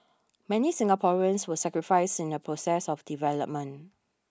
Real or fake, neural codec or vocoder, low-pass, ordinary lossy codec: real; none; none; none